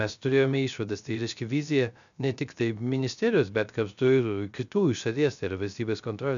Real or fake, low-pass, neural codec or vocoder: fake; 7.2 kHz; codec, 16 kHz, 0.3 kbps, FocalCodec